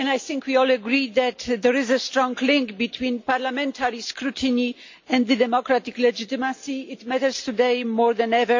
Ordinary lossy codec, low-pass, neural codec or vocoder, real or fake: MP3, 48 kbps; 7.2 kHz; none; real